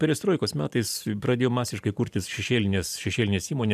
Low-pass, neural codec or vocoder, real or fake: 14.4 kHz; vocoder, 44.1 kHz, 128 mel bands every 512 samples, BigVGAN v2; fake